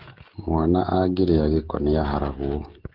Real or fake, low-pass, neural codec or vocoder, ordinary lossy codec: fake; 5.4 kHz; codec, 16 kHz, 16 kbps, FreqCodec, smaller model; Opus, 16 kbps